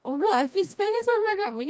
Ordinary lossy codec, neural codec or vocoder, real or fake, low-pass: none; codec, 16 kHz, 1 kbps, FreqCodec, larger model; fake; none